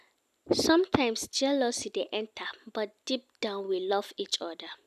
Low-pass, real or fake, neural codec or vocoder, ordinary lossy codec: 14.4 kHz; real; none; none